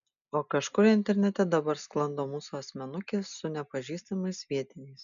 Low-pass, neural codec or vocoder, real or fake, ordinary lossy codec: 7.2 kHz; none; real; MP3, 96 kbps